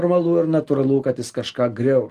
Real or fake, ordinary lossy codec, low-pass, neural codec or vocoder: real; Opus, 24 kbps; 14.4 kHz; none